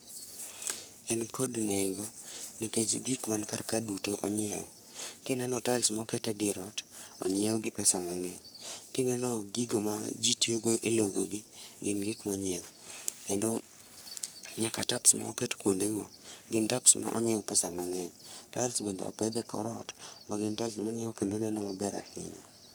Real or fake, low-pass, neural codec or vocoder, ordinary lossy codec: fake; none; codec, 44.1 kHz, 3.4 kbps, Pupu-Codec; none